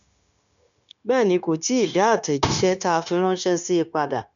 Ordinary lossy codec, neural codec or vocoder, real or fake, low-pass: none; codec, 16 kHz, 0.9 kbps, LongCat-Audio-Codec; fake; 7.2 kHz